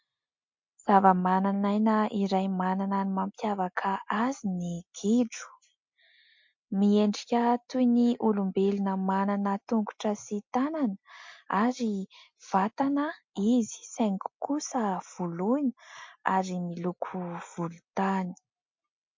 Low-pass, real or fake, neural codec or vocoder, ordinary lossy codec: 7.2 kHz; real; none; MP3, 48 kbps